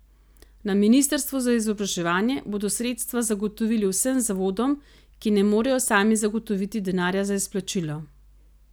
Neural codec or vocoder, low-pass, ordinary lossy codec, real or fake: none; none; none; real